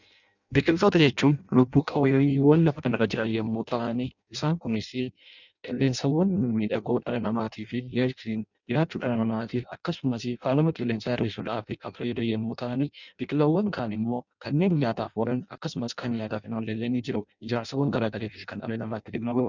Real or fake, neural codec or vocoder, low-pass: fake; codec, 16 kHz in and 24 kHz out, 0.6 kbps, FireRedTTS-2 codec; 7.2 kHz